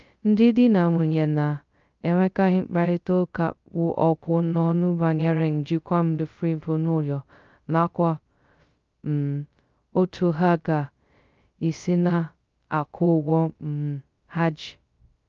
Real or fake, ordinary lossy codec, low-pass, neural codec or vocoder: fake; Opus, 24 kbps; 7.2 kHz; codec, 16 kHz, 0.2 kbps, FocalCodec